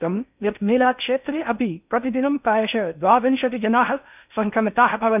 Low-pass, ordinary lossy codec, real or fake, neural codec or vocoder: 3.6 kHz; none; fake; codec, 16 kHz in and 24 kHz out, 0.6 kbps, FocalCodec, streaming, 4096 codes